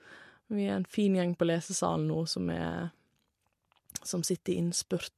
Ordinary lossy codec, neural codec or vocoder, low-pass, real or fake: MP3, 64 kbps; none; 14.4 kHz; real